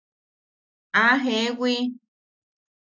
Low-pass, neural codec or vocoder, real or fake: 7.2 kHz; none; real